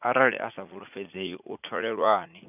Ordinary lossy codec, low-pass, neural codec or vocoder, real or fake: none; 3.6 kHz; vocoder, 44.1 kHz, 80 mel bands, Vocos; fake